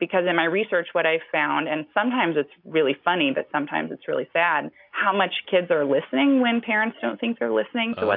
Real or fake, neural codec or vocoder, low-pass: real; none; 5.4 kHz